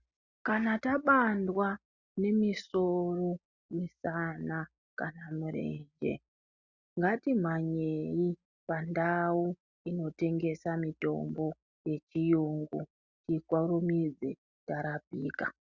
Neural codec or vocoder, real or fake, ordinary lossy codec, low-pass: none; real; Opus, 64 kbps; 7.2 kHz